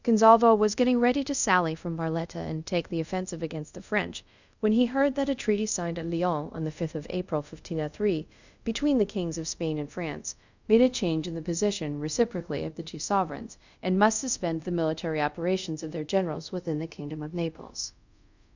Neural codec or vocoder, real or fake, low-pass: codec, 24 kHz, 0.5 kbps, DualCodec; fake; 7.2 kHz